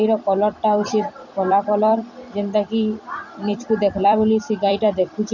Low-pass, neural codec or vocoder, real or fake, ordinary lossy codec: 7.2 kHz; vocoder, 44.1 kHz, 128 mel bands every 256 samples, BigVGAN v2; fake; none